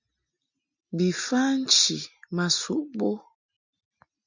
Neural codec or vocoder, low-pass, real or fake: none; 7.2 kHz; real